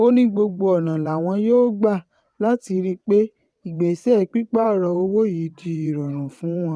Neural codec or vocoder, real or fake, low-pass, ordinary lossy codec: vocoder, 22.05 kHz, 80 mel bands, WaveNeXt; fake; none; none